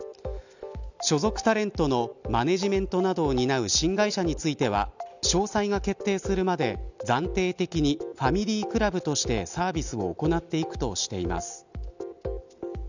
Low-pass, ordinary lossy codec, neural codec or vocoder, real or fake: 7.2 kHz; none; none; real